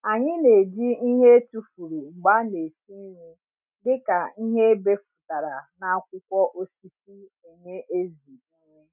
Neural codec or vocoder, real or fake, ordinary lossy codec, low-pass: none; real; none; 3.6 kHz